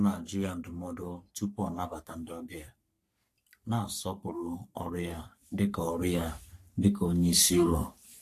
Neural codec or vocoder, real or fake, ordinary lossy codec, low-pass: codec, 44.1 kHz, 3.4 kbps, Pupu-Codec; fake; AAC, 64 kbps; 14.4 kHz